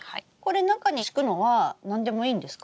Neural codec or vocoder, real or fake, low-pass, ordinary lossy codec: none; real; none; none